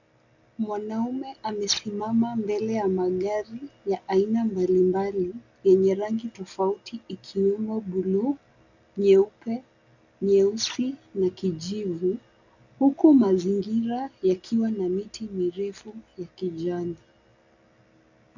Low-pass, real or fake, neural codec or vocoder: 7.2 kHz; real; none